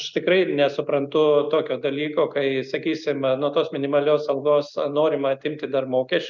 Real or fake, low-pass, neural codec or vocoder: real; 7.2 kHz; none